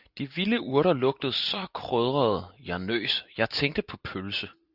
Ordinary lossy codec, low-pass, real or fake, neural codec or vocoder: Opus, 64 kbps; 5.4 kHz; real; none